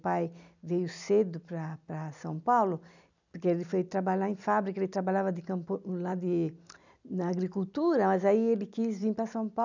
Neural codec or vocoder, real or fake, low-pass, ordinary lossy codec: none; real; 7.2 kHz; none